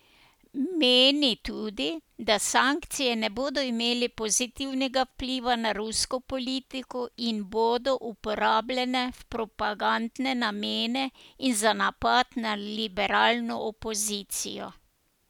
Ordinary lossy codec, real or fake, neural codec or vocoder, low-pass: none; real; none; 19.8 kHz